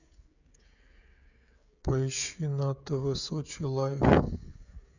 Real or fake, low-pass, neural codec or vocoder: fake; 7.2 kHz; codec, 16 kHz, 16 kbps, FreqCodec, smaller model